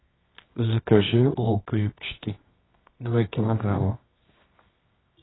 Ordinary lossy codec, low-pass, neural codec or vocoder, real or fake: AAC, 16 kbps; 7.2 kHz; codec, 24 kHz, 0.9 kbps, WavTokenizer, medium music audio release; fake